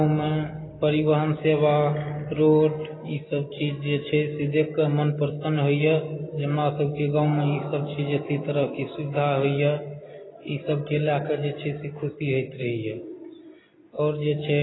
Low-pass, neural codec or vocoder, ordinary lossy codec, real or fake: 7.2 kHz; none; AAC, 16 kbps; real